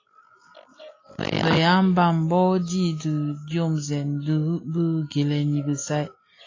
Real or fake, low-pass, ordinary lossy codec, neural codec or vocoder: real; 7.2 kHz; AAC, 32 kbps; none